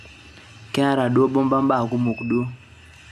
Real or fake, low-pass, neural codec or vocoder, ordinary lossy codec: real; 14.4 kHz; none; none